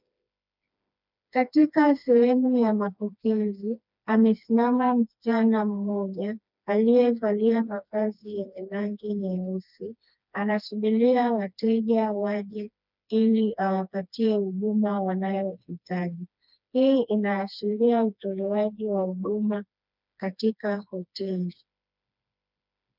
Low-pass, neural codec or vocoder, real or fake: 5.4 kHz; codec, 16 kHz, 2 kbps, FreqCodec, smaller model; fake